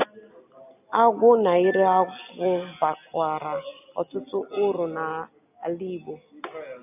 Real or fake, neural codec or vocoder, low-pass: real; none; 3.6 kHz